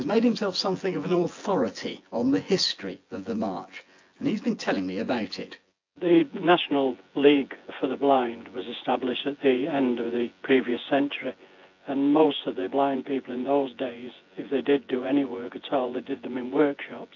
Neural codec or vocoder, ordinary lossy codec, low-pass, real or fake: vocoder, 24 kHz, 100 mel bands, Vocos; AAC, 48 kbps; 7.2 kHz; fake